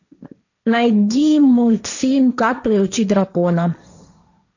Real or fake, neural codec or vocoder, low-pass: fake; codec, 16 kHz, 1.1 kbps, Voila-Tokenizer; 7.2 kHz